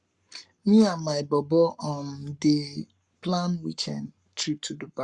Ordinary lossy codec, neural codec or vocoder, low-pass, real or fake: Opus, 64 kbps; codec, 44.1 kHz, 7.8 kbps, Pupu-Codec; 10.8 kHz; fake